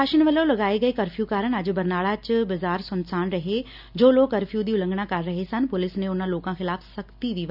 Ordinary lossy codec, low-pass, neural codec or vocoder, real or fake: none; 5.4 kHz; none; real